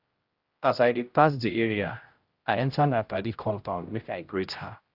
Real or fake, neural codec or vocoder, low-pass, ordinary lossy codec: fake; codec, 16 kHz, 0.5 kbps, X-Codec, HuBERT features, trained on general audio; 5.4 kHz; Opus, 32 kbps